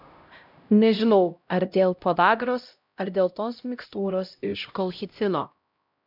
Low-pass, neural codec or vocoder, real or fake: 5.4 kHz; codec, 16 kHz, 0.5 kbps, X-Codec, HuBERT features, trained on LibriSpeech; fake